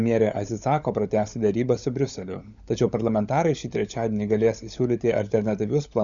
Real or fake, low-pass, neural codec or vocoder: fake; 7.2 kHz; codec, 16 kHz, 16 kbps, FunCodec, trained on LibriTTS, 50 frames a second